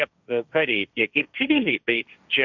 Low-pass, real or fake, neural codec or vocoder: 7.2 kHz; fake; codec, 16 kHz, 1.1 kbps, Voila-Tokenizer